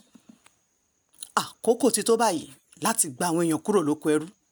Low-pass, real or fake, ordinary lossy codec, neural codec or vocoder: none; real; none; none